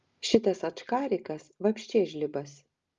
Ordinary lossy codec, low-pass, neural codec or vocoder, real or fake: Opus, 32 kbps; 7.2 kHz; none; real